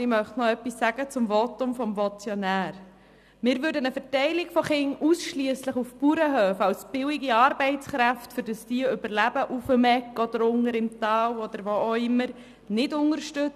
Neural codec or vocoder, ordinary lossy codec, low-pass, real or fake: none; none; 14.4 kHz; real